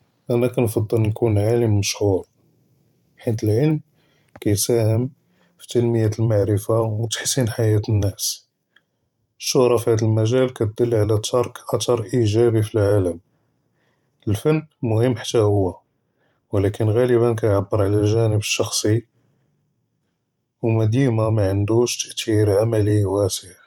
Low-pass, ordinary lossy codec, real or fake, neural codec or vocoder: 19.8 kHz; none; fake; vocoder, 44.1 kHz, 128 mel bands every 512 samples, BigVGAN v2